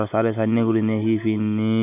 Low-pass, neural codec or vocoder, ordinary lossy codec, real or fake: 3.6 kHz; none; none; real